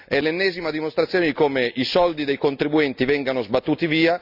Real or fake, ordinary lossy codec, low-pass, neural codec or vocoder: real; none; 5.4 kHz; none